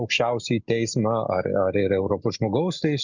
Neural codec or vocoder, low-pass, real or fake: none; 7.2 kHz; real